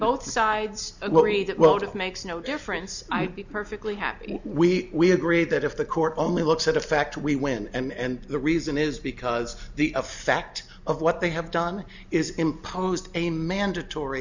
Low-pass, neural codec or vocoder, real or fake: 7.2 kHz; none; real